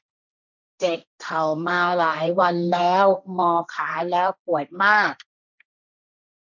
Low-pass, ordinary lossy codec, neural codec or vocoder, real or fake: 7.2 kHz; none; codec, 16 kHz, 1.1 kbps, Voila-Tokenizer; fake